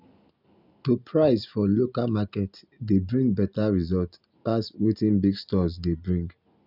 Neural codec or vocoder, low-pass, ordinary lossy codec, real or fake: none; 5.4 kHz; none; real